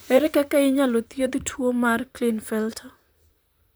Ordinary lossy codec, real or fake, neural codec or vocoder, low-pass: none; fake; vocoder, 44.1 kHz, 128 mel bands, Pupu-Vocoder; none